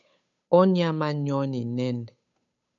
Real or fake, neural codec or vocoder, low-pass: fake; codec, 16 kHz, 8 kbps, FunCodec, trained on Chinese and English, 25 frames a second; 7.2 kHz